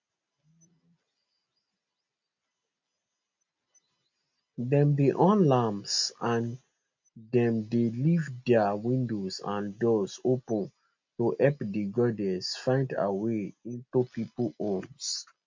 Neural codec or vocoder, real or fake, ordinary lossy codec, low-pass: none; real; MP3, 48 kbps; 7.2 kHz